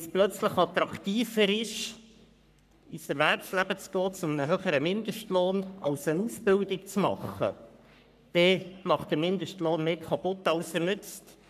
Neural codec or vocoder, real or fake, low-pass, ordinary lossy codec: codec, 44.1 kHz, 3.4 kbps, Pupu-Codec; fake; 14.4 kHz; none